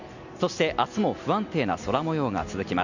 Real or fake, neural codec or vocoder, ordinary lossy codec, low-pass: real; none; none; 7.2 kHz